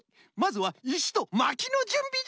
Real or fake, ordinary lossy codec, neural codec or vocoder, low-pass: real; none; none; none